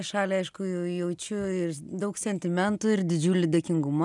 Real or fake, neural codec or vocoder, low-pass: real; none; 10.8 kHz